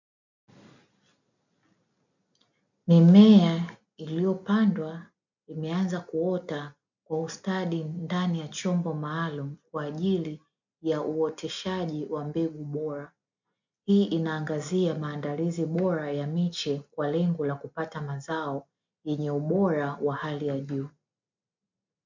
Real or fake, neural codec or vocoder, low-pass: real; none; 7.2 kHz